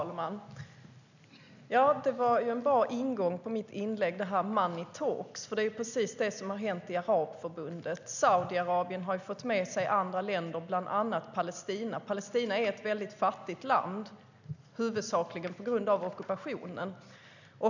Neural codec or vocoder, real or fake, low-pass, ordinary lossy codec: none; real; 7.2 kHz; none